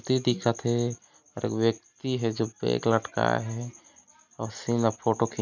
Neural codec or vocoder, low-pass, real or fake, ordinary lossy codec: none; 7.2 kHz; real; none